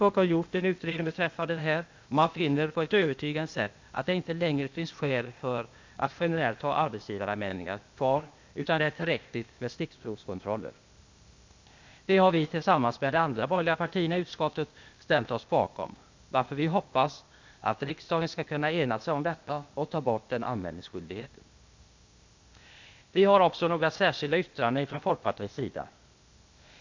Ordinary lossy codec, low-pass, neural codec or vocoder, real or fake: MP3, 64 kbps; 7.2 kHz; codec, 16 kHz, 0.8 kbps, ZipCodec; fake